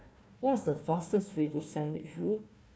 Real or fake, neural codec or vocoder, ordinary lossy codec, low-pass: fake; codec, 16 kHz, 1 kbps, FunCodec, trained on Chinese and English, 50 frames a second; none; none